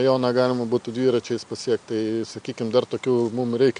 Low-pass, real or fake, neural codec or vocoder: 9.9 kHz; real; none